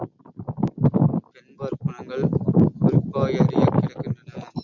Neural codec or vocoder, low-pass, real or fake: none; 7.2 kHz; real